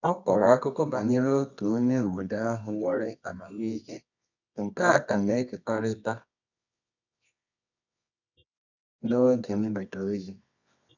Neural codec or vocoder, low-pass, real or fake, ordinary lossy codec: codec, 24 kHz, 0.9 kbps, WavTokenizer, medium music audio release; 7.2 kHz; fake; none